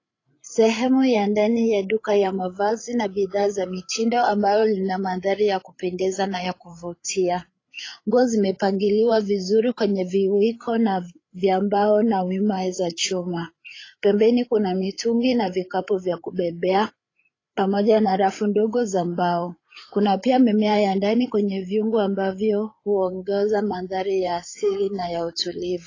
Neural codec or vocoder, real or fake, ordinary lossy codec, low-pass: codec, 16 kHz, 8 kbps, FreqCodec, larger model; fake; AAC, 32 kbps; 7.2 kHz